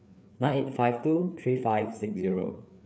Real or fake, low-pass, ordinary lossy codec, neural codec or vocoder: fake; none; none; codec, 16 kHz, 4 kbps, FreqCodec, larger model